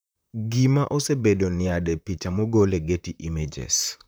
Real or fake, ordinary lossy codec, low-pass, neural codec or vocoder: fake; none; none; vocoder, 44.1 kHz, 128 mel bands, Pupu-Vocoder